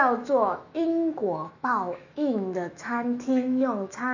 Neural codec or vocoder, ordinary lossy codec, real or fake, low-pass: autoencoder, 48 kHz, 128 numbers a frame, DAC-VAE, trained on Japanese speech; none; fake; 7.2 kHz